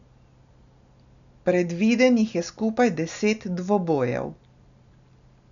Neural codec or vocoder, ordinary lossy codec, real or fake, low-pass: none; none; real; 7.2 kHz